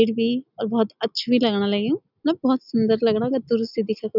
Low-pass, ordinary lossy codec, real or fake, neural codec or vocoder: 5.4 kHz; none; real; none